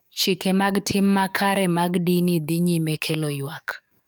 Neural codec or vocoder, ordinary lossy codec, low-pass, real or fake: codec, 44.1 kHz, 7.8 kbps, DAC; none; none; fake